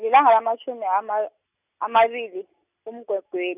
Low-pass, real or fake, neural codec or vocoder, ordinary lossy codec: 3.6 kHz; real; none; none